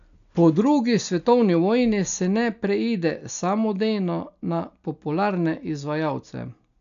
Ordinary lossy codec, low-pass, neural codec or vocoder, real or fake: none; 7.2 kHz; none; real